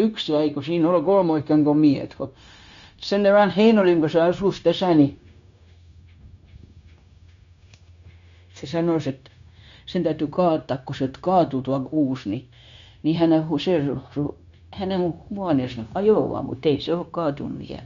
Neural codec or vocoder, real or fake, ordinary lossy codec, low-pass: codec, 16 kHz, 0.9 kbps, LongCat-Audio-Codec; fake; MP3, 64 kbps; 7.2 kHz